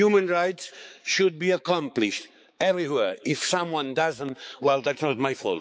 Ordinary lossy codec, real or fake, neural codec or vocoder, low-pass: none; fake; codec, 16 kHz, 4 kbps, X-Codec, HuBERT features, trained on balanced general audio; none